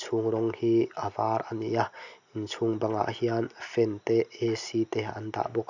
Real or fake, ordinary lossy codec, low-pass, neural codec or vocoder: real; none; 7.2 kHz; none